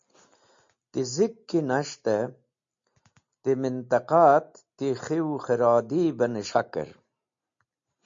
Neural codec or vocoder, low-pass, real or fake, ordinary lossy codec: none; 7.2 kHz; real; MP3, 48 kbps